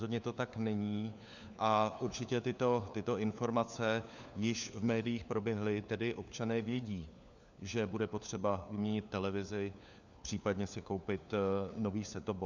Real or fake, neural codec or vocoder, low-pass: fake; codec, 16 kHz, 4 kbps, FunCodec, trained on LibriTTS, 50 frames a second; 7.2 kHz